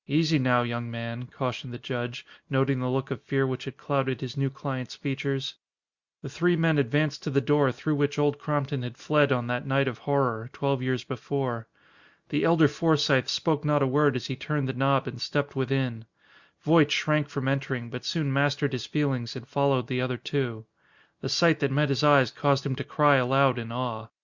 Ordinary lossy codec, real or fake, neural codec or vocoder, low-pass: Opus, 64 kbps; real; none; 7.2 kHz